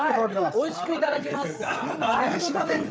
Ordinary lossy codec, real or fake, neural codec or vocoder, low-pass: none; fake; codec, 16 kHz, 8 kbps, FreqCodec, larger model; none